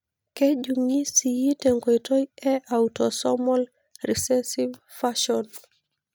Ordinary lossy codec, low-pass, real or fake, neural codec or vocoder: none; none; real; none